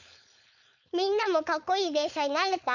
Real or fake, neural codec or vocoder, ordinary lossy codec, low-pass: fake; codec, 16 kHz, 4.8 kbps, FACodec; none; 7.2 kHz